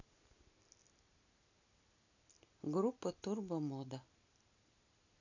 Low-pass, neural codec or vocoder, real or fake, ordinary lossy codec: 7.2 kHz; none; real; none